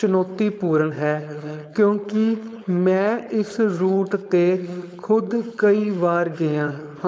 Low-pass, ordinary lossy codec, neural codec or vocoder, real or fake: none; none; codec, 16 kHz, 4.8 kbps, FACodec; fake